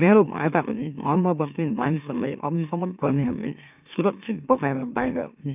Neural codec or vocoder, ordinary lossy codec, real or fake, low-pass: autoencoder, 44.1 kHz, a latent of 192 numbers a frame, MeloTTS; none; fake; 3.6 kHz